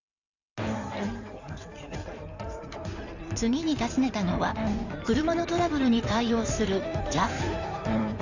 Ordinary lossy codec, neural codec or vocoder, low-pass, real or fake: none; codec, 16 kHz in and 24 kHz out, 2.2 kbps, FireRedTTS-2 codec; 7.2 kHz; fake